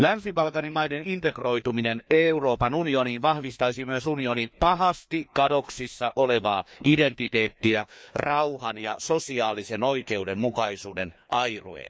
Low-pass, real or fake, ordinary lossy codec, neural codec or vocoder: none; fake; none; codec, 16 kHz, 2 kbps, FreqCodec, larger model